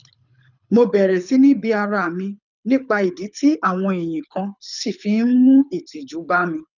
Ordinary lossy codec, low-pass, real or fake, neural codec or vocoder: none; 7.2 kHz; fake; codec, 24 kHz, 6 kbps, HILCodec